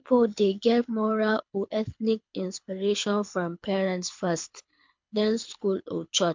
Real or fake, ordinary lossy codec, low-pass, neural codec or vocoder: fake; MP3, 64 kbps; 7.2 kHz; codec, 24 kHz, 6 kbps, HILCodec